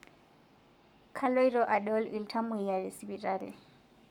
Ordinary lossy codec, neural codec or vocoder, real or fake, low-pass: none; codec, 44.1 kHz, 7.8 kbps, Pupu-Codec; fake; 19.8 kHz